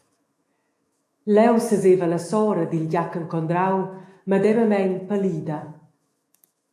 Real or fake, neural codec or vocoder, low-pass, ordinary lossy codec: fake; autoencoder, 48 kHz, 128 numbers a frame, DAC-VAE, trained on Japanese speech; 14.4 kHz; AAC, 64 kbps